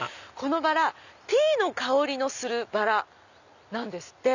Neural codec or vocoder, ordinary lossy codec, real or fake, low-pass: none; none; real; 7.2 kHz